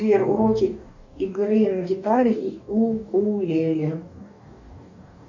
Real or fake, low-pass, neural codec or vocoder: fake; 7.2 kHz; codec, 44.1 kHz, 2.6 kbps, DAC